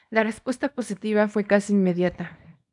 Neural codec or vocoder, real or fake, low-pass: codec, 24 kHz, 0.9 kbps, WavTokenizer, small release; fake; 10.8 kHz